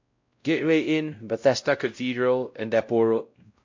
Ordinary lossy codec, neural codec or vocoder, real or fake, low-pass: MP3, 48 kbps; codec, 16 kHz, 0.5 kbps, X-Codec, WavLM features, trained on Multilingual LibriSpeech; fake; 7.2 kHz